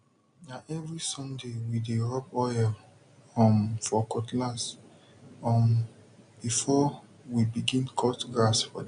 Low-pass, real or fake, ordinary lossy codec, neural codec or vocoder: 9.9 kHz; real; none; none